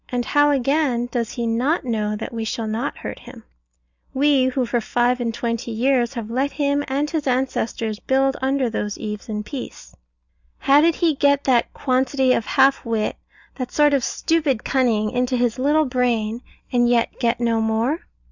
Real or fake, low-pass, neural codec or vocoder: real; 7.2 kHz; none